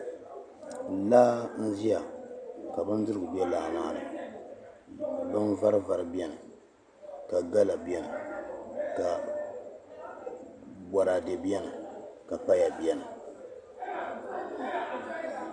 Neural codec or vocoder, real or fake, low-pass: none; real; 9.9 kHz